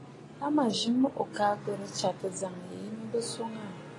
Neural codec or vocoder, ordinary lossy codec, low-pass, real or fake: none; AAC, 32 kbps; 10.8 kHz; real